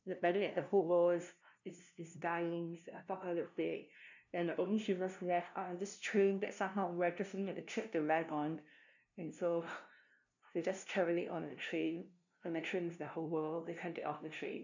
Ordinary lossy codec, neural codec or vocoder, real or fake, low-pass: none; codec, 16 kHz, 0.5 kbps, FunCodec, trained on LibriTTS, 25 frames a second; fake; 7.2 kHz